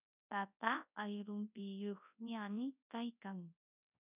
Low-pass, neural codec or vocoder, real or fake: 3.6 kHz; codec, 24 kHz, 0.9 kbps, WavTokenizer, large speech release; fake